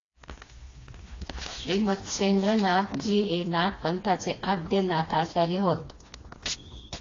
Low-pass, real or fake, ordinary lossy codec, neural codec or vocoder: 7.2 kHz; fake; AAC, 32 kbps; codec, 16 kHz, 2 kbps, FreqCodec, smaller model